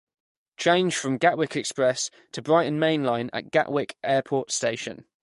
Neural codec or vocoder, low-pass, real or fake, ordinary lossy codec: codec, 44.1 kHz, 7.8 kbps, DAC; 14.4 kHz; fake; MP3, 48 kbps